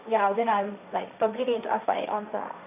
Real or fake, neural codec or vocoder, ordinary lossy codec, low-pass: fake; codec, 16 kHz, 1.1 kbps, Voila-Tokenizer; none; 3.6 kHz